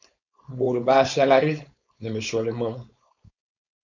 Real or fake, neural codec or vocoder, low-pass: fake; codec, 16 kHz, 4.8 kbps, FACodec; 7.2 kHz